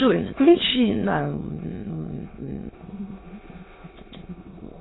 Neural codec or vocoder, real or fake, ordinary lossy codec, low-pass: autoencoder, 22.05 kHz, a latent of 192 numbers a frame, VITS, trained on many speakers; fake; AAC, 16 kbps; 7.2 kHz